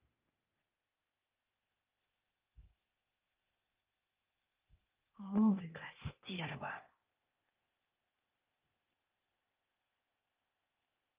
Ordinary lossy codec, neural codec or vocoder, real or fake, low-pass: Opus, 24 kbps; codec, 16 kHz, 0.8 kbps, ZipCodec; fake; 3.6 kHz